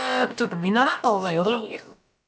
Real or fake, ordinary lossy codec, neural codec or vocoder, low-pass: fake; none; codec, 16 kHz, about 1 kbps, DyCAST, with the encoder's durations; none